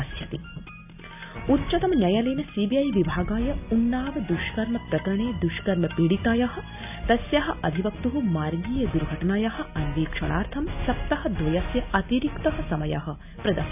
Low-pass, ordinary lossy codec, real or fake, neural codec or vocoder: 3.6 kHz; none; real; none